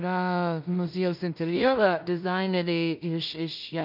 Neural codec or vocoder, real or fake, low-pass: codec, 16 kHz in and 24 kHz out, 0.4 kbps, LongCat-Audio-Codec, two codebook decoder; fake; 5.4 kHz